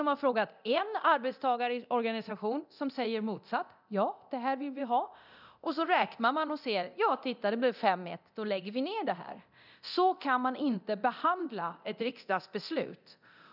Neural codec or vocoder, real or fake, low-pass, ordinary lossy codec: codec, 24 kHz, 0.9 kbps, DualCodec; fake; 5.4 kHz; none